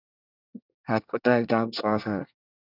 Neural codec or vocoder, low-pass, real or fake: codec, 24 kHz, 1 kbps, SNAC; 5.4 kHz; fake